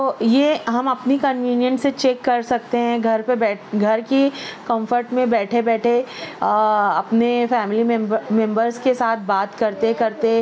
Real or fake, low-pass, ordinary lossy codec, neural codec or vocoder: real; none; none; none